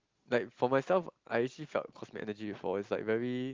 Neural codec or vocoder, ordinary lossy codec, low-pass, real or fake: none; Opus, 32 kbps; 7.2 kHz; real